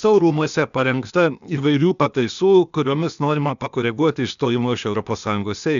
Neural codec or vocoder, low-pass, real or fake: codec, 16 kHz, 0.8 kbps, ZipCodec; 7.2 kHz; fake